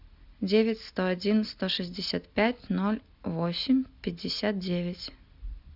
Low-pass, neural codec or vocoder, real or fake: 5.4 kHz; none; real